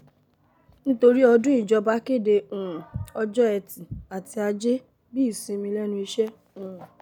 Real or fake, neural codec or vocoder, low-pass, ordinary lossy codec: real; none; 19.8 kHz; none